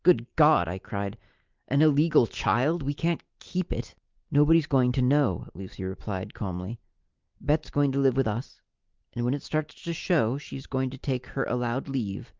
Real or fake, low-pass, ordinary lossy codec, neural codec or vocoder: real; 7.2 kHz; Opus, 32 kbps; none